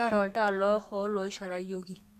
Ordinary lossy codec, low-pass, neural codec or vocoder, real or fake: none; 14.4 kHz; codec, 32 kHz, 1.9 kbps, SNAC; fake